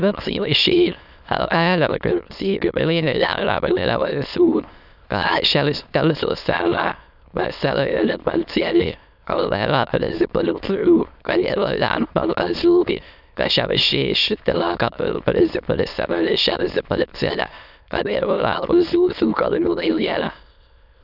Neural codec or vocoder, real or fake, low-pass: autoencoder, 22.05 kHz, a latent of 192 numbers a frame, VITS, trained on many speakers; fake; 5.4 kHz